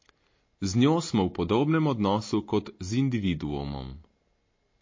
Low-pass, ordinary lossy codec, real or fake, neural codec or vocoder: 7.2 kHz; MP3, 32 kbps; real; none